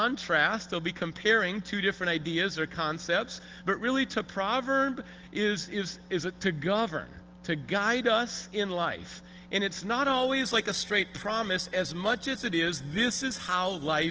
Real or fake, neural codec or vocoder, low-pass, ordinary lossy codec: real; none; 7.2 kHz; Opus, 16 kbps